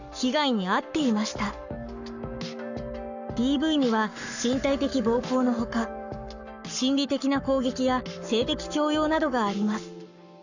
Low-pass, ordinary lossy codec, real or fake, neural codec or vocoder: 7.2 kHz; none; fake; codec, 44.1 kHz, 7.8 kbps, Pupu-Codec